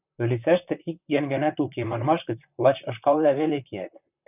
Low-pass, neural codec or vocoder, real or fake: 3.6 kHz; vocoder, 44.1 kHz, 128 mel bands, Pupu-Vocoder; fake